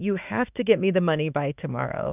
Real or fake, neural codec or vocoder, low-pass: fake; autoencoder, 48 kHz, 32 numbers a frame, DAC-VAE, trained on Japanese speech; 3.6 kHz